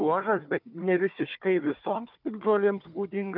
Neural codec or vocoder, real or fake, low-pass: codec, 16 kHz, 4 kbps, FunCodec, trained on Chinese and English, 50 frames a second; fake; 5.4 kHz